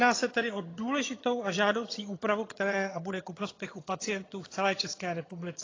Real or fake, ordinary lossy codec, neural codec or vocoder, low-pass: fake; AAC, 32 kbps; vocoder, 22.05 kHz, 80 mel bands, HiFi-GAN; 7.2 kHz